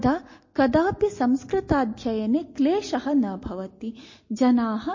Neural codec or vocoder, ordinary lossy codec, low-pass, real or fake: none; MP3, 32 kbps; 7.2 kHz; real